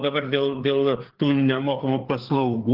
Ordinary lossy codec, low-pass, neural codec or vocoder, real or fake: Opus, 16 kbps; 5.4 kHz; codec, 16 kHz, 4 kbps, FreqCodec, larger model; fake